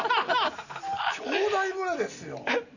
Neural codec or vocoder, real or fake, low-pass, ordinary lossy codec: none; real; 7.2 kHz; none